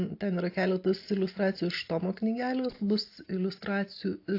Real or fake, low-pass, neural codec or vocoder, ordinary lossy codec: real; 5.4 kHz; none; AAC, 32 kbps